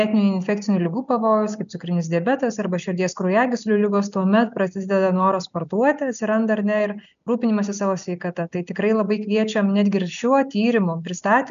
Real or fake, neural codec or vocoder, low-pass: real; none; 7.2 kHz